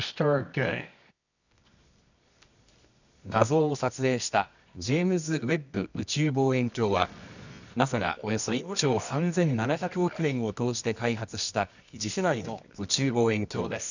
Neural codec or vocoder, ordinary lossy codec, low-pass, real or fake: codec, 24 kHz, 0.9 kbps, WavTokenizer, medium music audio release; none; 7.2 kHz; fake